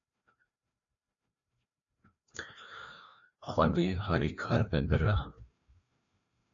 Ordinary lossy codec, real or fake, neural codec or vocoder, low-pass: AAC, 64 kbps; fake; codec, 16 kHz, 1 kbps, FreqCodec, larger model; 7.2 kHz